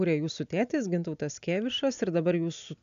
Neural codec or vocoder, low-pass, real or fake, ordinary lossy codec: none; 7.2 kHz; real; AAC, 96 kbps